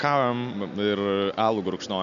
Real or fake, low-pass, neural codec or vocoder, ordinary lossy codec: real; 7.2 kHz; none; AAC, 96 kbps